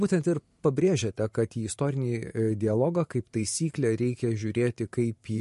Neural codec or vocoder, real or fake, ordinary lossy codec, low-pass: vocoder, 44.1 kHz, 128 mel bands every 512 samples, BigVGAN v2; fake; MP3, 48 kbps; 14.4 kHz